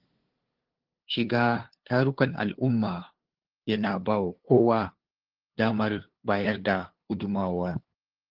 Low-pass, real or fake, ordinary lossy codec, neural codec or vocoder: 5.4 kHz; fake; Opus, 16 kbps; codec, 16 kHz, 2 kbps, FunCodec, trained on LibriTTS, 25 frames a second